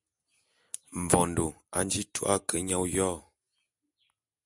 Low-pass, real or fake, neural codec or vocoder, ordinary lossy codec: 10.8 kHz; real; none; MP3, 96 kbps